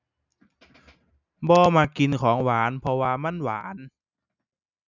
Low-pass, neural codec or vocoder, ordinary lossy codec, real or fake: 7.2 kHz; none; none; real